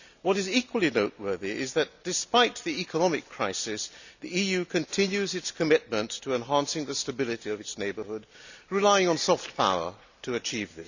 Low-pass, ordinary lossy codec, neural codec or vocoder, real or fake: 7.2 kHz; none; none; real